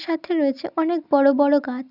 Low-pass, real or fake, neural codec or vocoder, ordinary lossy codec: 5.4 kHz; real; none; none